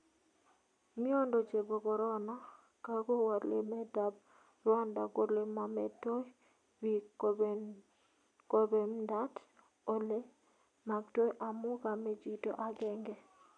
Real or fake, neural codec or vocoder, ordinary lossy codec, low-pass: real; none; none; 9.9 kHz